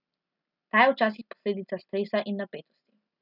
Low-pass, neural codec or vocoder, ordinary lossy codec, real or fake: 5.4 kHz; none; none; real